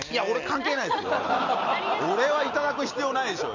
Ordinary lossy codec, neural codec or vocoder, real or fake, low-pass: none; none; real; 7.2 kHz